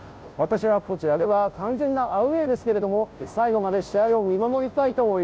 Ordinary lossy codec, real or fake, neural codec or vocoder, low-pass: none; fake; codec, 16 kHz, 0.5 kbps, FunCodec, trained on Chinese and English, 25 frames a second; none